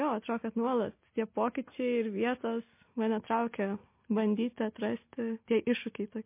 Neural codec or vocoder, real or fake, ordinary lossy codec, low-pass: none; real; MP3, 24 kbps; 3.6 kHz